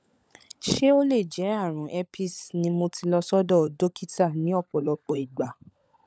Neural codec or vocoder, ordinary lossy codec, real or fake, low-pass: codec, 16 kHz, 16 kbps, FunCodec, trained on LibriTTS, 50 frames a second; none; fake; none